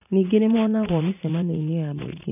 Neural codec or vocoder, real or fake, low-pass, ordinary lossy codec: vocoder, 44.1 kHz, 80 mel bands, Vocos; fake; 3.6 kHz; none